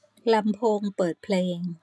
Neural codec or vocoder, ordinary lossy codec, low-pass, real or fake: none; none; none; real